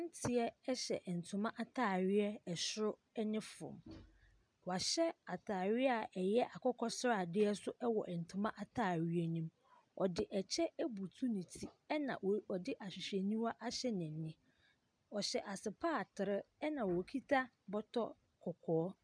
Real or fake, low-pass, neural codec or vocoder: real; 9.9 kHz; none